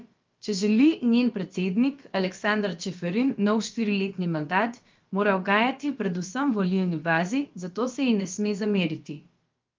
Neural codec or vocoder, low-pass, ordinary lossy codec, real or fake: codec, 16 kHz, about 1 kbps, DyCAST, with the encoder's durations; 7.2 kHz; Opus, 32 kbps; fake